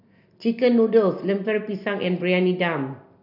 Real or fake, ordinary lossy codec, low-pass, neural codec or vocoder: real; none; 5.4 kHz; none